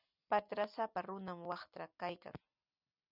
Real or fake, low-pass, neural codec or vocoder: real; 5.4 kHz; none